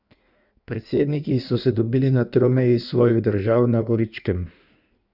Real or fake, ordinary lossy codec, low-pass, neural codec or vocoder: fake; none; 5.4 kHz; codec, 16 kHz in and 24 kHz out, 1.1 kbps, FireRedTTS-2 codec